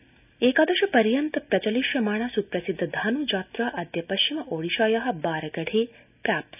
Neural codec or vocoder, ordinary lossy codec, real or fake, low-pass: none; none; real; 3.6 kHz